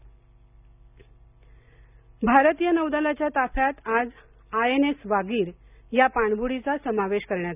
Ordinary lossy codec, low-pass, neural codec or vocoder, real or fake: none; 3.6 kHz; none; real